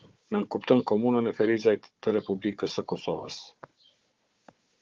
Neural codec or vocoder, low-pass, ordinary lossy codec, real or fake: codec, 16 kHz, 4 kbps, FunCodec, trained on Chinese and English, 50 frames a second; 7.2 kHz; Opus, 32 kbps; fake